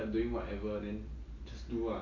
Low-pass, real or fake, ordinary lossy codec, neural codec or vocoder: 7.2 kHz; real; none; none